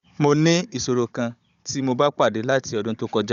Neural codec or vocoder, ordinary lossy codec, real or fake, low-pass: codec, 16 kHz, 16 kbps, FunCodec, trained on Chinese and English, 50 frames a second; Opus, 64 kbps; fake; 7.2 kHz